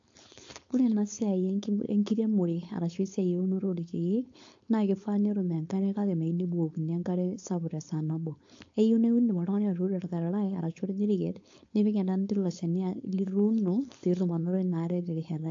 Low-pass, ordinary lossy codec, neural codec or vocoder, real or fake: 7.2 kHz; AAC, 48 kbps; codec, 16 kHz, 4.8 kbps, FACodec; fake